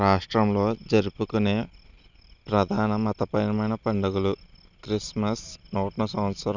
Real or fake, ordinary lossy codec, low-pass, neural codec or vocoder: real; none; 7.2 kHz; none